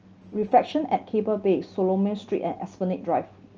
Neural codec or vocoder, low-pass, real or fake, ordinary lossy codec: none; 7.2 kHz; real; Opus, 24 kbps